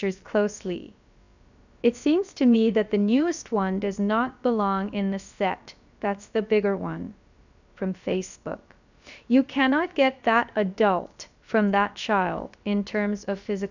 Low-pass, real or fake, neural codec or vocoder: 7.2 kHz; fake; codec, 16 kHz, about 1 kbps, DyCAST, with the encoder's durations